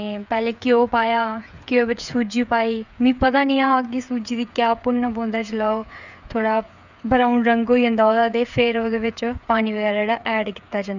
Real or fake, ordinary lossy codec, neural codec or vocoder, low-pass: fake; none; codec, 16 kHz, 8 kbps, FreqCodec, smaller model; 7.2 kHz